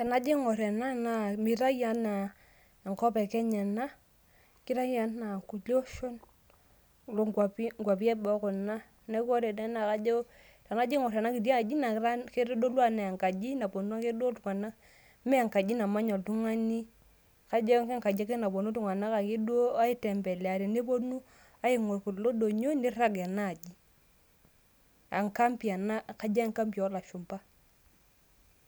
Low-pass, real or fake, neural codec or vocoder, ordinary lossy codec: none; real; none; none